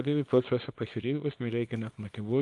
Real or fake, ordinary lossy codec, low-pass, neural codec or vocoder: fake; Opus, 32 kbps; 10.8 kHz; codec, 24 kHz, 0.9 kbps, WavTokenizer, small release